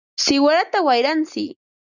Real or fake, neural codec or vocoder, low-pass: real; none; 7.2 kHz